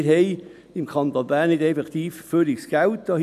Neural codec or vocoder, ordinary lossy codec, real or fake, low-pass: none; none; real; 14.4 kHz